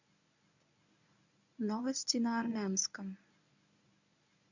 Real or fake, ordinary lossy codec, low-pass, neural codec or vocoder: fake; none; 7.2 kHz; codec, 24 kHz, 0.9 kbps, WavTokenizer, medium speech release version 1